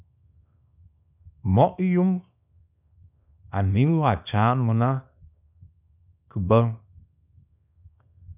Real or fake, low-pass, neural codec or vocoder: fake; 3.6 kHz; codec, 24 kHz, 0.9 kbps, WavTokenizer, small release